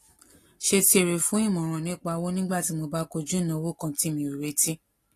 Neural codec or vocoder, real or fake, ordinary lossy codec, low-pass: none; real; AAC, 48 kbps; 14.4 kHz